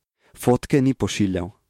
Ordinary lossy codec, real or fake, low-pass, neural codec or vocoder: MP3, 64 kbps; fake; 19.8 kHz; autoencoder, 48 kHz, 128 numbers a frame, DAC-VAE, trained on Japanese speech